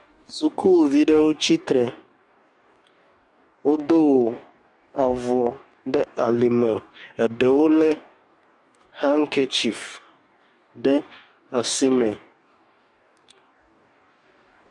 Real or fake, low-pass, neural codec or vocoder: fake; 10.8 kHz; codec, 44.1 kHz, 2.6 kbps, DAC